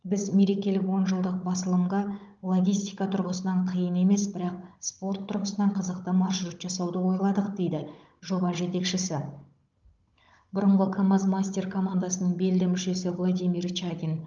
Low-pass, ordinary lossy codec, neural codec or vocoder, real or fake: 7.2 kHz; Opus, 32 kbps; codec, 16 kHz, 16 kbps, FunCodec, trained on Chinese and English, 50 frames a second; fake